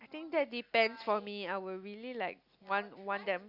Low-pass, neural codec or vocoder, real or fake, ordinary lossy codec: 5.4 kHz; none; real; Opus, 64 kbps